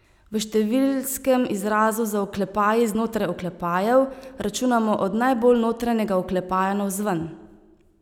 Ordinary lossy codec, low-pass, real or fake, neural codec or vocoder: none; 19.8 kHz; real; none